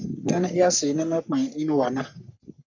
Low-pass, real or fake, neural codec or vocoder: 7.2 kHz; fake; codec, 44.1 kHz, 3.4 kbps, Pupu-Codec